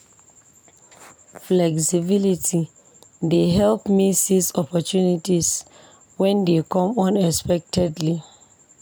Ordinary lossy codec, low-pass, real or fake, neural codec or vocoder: none; none; real; none